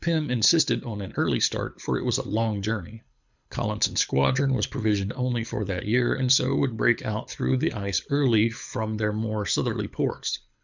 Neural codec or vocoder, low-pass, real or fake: codec, 24 kHz, 6 kbps, HILCodec; 7.2 kHz; fake